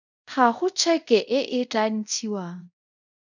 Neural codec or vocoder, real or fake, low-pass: codec, 24 kHz, 0.5 kbps, DualCodec; fake; 7.2 kHz